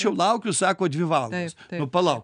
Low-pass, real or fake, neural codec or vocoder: 9.9 kHz; real; none